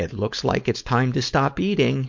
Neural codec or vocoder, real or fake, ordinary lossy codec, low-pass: codec, 16 kHz, 4.8 kbps, FACodec; fake; MP3, 48 kbps; 7.2 kHz